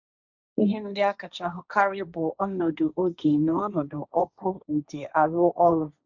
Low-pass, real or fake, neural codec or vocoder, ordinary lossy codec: 7.2 kHz; fake; codec, 16 kHz, 1.1 kbps, Voila-Tokenizer; none